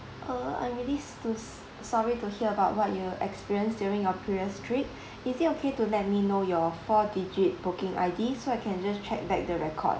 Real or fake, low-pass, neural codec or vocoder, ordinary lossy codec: real; none; none; none